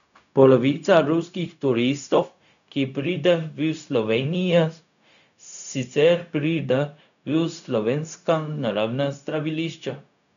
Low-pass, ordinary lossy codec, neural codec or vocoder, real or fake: 7.2 kHz; none; codec, 16 kHz, 0.4 kbps, LongCat-Audio-Codec; fake